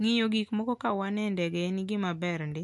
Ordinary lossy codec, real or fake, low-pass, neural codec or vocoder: MP3, 64 kbps; real; 10.8 kHz; none